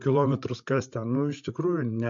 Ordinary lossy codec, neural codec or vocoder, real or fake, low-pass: MP3, 48 kbps; codec, 16 kHz, 8 kbps, FreqCodec, larger model; fake; 7.2 kHz